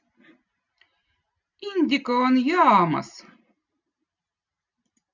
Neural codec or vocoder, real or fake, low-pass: none; real; 7.2 kHz